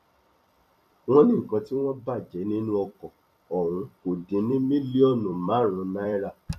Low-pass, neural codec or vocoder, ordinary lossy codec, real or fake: 14.4 kHz; vocoder, 44.1 kHz, 128 mel bands every 256 samples, BigVGAN v2; none; fake